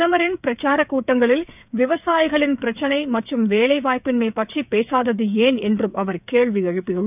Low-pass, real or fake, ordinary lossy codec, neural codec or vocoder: 3.6 kHz; fake; none; codec, 16 kHz, 8 kbps, FreqCodec, smaller model